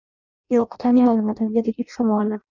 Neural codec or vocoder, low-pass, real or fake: codec, 16 kHz in and 24 kHz out, 0.6 kbps, FireRedTTS-2 codec; 7.2 kHz; fake